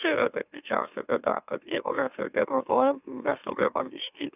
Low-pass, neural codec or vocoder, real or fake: 3.6 kHz; autoencoder, 44.1 kHz, a latent of 192 numbers a frame, MeloTTS; fake